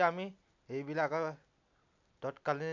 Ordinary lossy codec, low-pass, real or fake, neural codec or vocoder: AAC, 48 kbps; 7.2 kHz; real; none